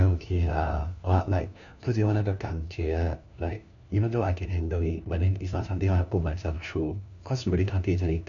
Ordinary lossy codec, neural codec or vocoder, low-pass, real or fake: none; codec, 16 kHz, 1 kbps, FunCodec, trained on LibriTTS, 50 frames a second; 7.2 kHz; fake